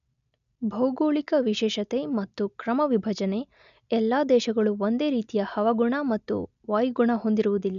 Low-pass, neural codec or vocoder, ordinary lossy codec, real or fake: 7.2 kHz; none; none; real